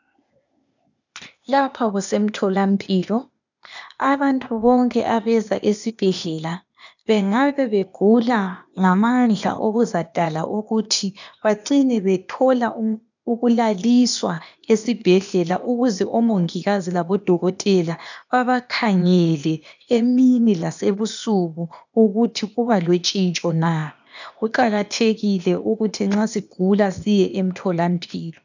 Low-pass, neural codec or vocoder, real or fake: 7.2 kHz; codec, 16 kHz, 0.8 kbps, ZipCodec; fake